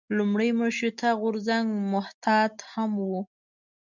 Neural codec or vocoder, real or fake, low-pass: none; real; 7.2 kHz